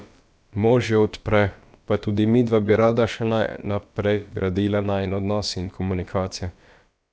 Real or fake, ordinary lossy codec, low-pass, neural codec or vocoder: fake; none; none; codec, 16 kHz, about 1 kbps, DyCAST, with the encoder's durations